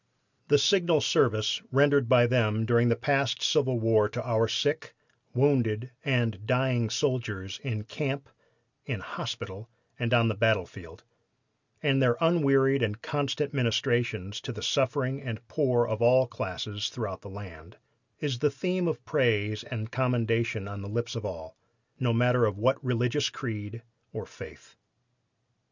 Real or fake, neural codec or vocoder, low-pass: real; none; 7.2 kHz